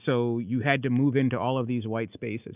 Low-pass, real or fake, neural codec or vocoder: 3.6 kHz; real; none